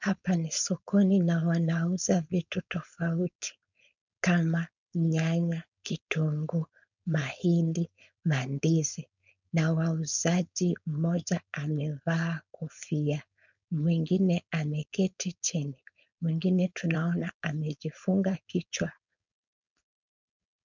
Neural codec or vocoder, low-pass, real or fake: codec, 16 kHz, 4.8 kbps, FACodec; 7.2 kHz; fake